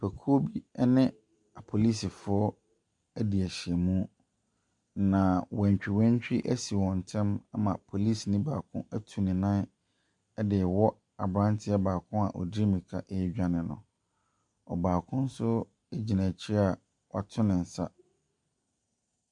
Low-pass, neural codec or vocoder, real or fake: 10.8 kHz; none; real